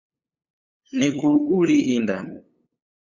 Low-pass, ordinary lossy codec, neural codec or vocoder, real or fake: 7.2 kHz; Opus, 64 kbps; codec, 16 kHz, 8 kbps, FunCodec, trained on LibriTTS, 25 frames a second; fake